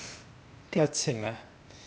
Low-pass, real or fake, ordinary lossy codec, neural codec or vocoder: none; fake; none; codec, 16 kHz, 0.8 kbps, ZipCodec